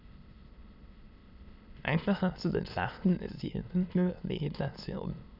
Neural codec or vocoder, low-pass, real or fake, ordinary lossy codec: autoencoder, 22.05 kHz, a latent of 192 numbers a frame, VITS, trained on many speakers; 5.4 kHz; fake; none